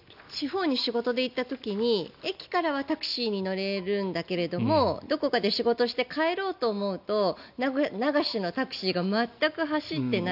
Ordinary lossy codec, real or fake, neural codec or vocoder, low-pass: none; real; none; 5.4 kHz